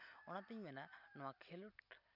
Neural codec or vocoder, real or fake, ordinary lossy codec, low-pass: none; real; none; 5.4 kHz